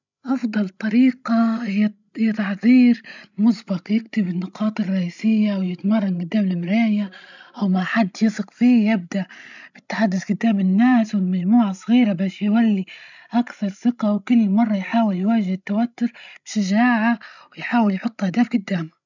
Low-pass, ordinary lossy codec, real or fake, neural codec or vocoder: 7.2 kHz; none; fake; codec, 16 kHz, 16 kbps, FreqCodec, larger model